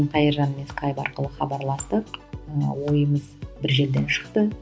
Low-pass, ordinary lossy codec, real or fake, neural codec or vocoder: none; none; real; none